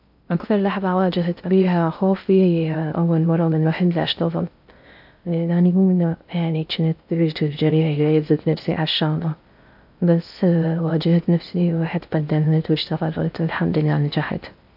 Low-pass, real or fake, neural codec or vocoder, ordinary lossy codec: 5.4 kHz; fake; codec, 16 kHz in and 24 kHz out, 0.6 kbps, FocalCodec, streaming, 2048 codes; AAC, 48 kbps